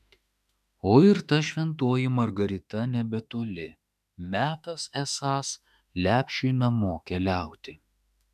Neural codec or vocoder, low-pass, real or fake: autoencoder, 48 kHz, 32 numbers a frame, DAC-VAE, trained on Japanese speech; 14.4 kHz; fake